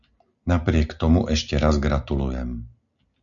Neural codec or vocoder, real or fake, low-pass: none; real; 7.2 kHz